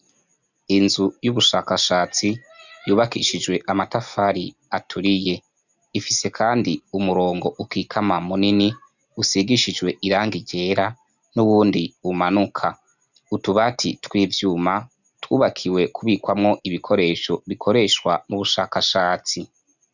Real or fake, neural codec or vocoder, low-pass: real; none; 7.2 kHz